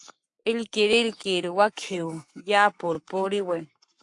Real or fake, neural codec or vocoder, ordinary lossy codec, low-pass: fake; codec, 44.1 kHz, 7.8 kbps, Pupu-Codec; AAC, 64 kbps; 10.8 kHz